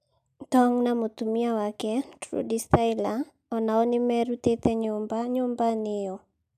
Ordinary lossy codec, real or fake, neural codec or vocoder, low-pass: none; real; none; 14.4 kHz